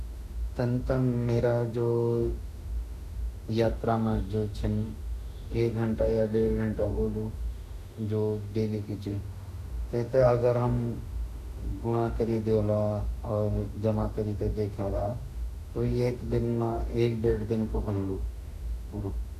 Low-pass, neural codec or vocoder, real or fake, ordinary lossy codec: 14.4 kHz; autoencoder, 48 kHz, 32 numbers a frame, DAC-VAE, trained on Japanese speech; fake; none